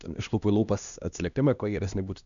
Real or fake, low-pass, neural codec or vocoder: fake; 7.2 kHz; codec, 16 kHz, 1 kbps, X-Codec, HuBERT features, trained on LibriSpeech